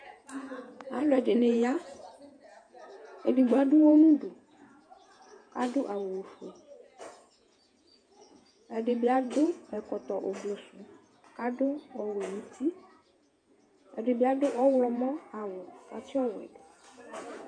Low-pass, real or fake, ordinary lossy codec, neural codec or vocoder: 9.9 kHz; fake; MP3, 64 kbps; vocoder, 48 kHz, 128 mel bands, Vocos